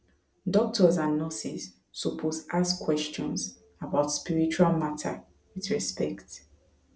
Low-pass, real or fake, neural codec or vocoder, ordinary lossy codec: none; real; none; none